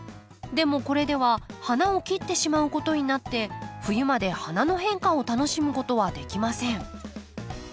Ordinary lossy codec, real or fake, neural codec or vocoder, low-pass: none; real; none; none